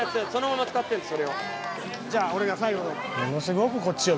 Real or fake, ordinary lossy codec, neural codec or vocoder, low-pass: real; none; none; none